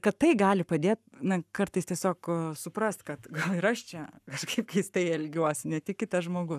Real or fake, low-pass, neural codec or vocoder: fake; 14.4 kHz; codec, 44.1 kHz, 7.8 kbps, Pupu-Codec